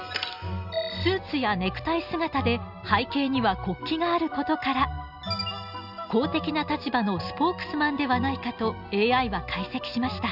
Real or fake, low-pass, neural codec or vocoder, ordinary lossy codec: real; 5.4 kHz; none; none